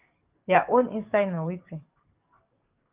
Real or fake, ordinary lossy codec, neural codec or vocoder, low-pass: fake; Opus, 32 kbps; codec, 44.1 kHz, 7.8 kbps, DAC; 3.6 kHz